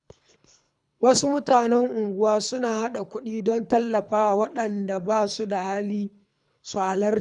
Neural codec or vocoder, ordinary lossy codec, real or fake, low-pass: codec, 24 kHz, 3 kbps, HILCodec; none; fake; 10.8 kHz